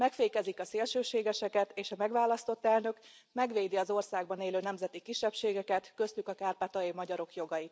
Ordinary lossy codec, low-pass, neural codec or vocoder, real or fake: none; none; none; real